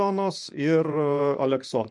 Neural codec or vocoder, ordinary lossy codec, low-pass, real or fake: vocoder, 24 kHz, 100 mel bands, Vocos; MP3, 64 kbps; 10.8 kHz; fake